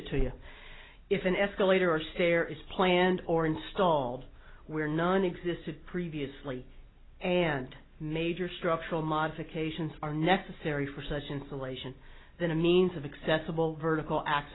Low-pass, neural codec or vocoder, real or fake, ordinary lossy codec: 7.2 kHz; none; real; AAC, 16 kbps